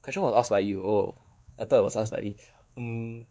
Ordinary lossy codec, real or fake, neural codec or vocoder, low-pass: none; fake; codec, 16 kHz, 2 kbps, X-Codec, WavLM features, trained on Multilingual LibriSpeech; none